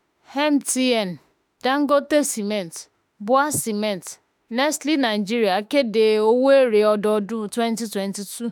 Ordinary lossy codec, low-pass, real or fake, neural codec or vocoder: none; none; fake; autoencoder, 48 kHz, 32 numbers a frame, DAC-VAE, trained on Japanese speech